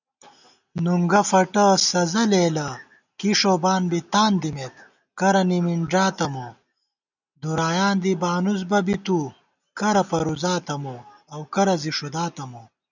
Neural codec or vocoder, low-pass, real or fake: none; 7.2 kHz; real